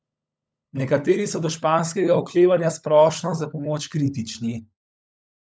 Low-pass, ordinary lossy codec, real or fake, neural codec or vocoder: none; none; fake; codec, 16 kHz, 16 kbps, FunCodec, trained on LibriTTS, 50 frames a second